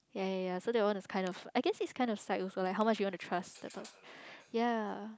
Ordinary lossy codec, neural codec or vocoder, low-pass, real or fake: none; none; none; real